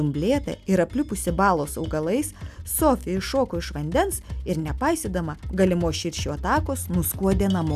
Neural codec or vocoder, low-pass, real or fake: none; 14.4 kHz; real